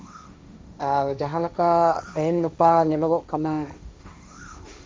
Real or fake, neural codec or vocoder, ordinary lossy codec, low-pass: fake; codec, 16 kHz, 1.1 kbps, Voila-Tokenizer; none; none